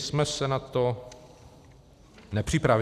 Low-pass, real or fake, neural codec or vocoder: 14.4 kHz; real; none